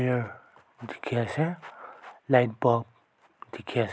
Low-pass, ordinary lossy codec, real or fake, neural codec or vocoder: none; none; real; none